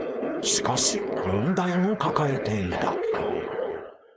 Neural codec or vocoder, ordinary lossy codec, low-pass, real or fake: codec, 16 kHz, 4.8 kbps, FACodec; none; none; fake